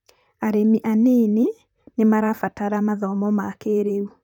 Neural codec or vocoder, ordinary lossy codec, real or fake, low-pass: vocoder, 44.1 kHz, 128 mel bands, Pupu-Vocoder; none; fake; 19.8 kHz